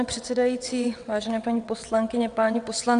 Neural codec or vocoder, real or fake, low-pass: vocoder, 22.05 kHz, 80 mel bands, WaveNeXt; fake; 9.9 kHz